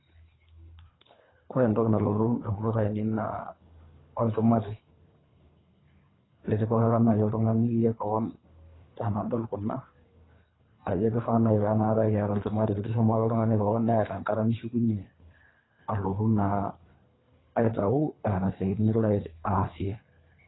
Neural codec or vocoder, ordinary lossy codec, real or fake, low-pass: codec, 24 kHz, 3 kbps, HILCodec; AAC, 16 kbps; fake; 7.2 kHz